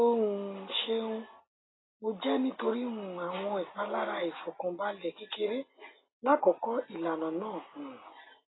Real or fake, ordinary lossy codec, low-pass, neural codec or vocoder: real; AAC, 16 kbps; 7.2 kHz; none